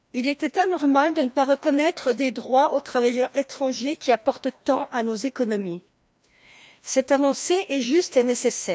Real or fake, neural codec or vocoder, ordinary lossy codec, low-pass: fake; codec, 16 kHz, 1 kbps, FreqCodec, larger model; none; none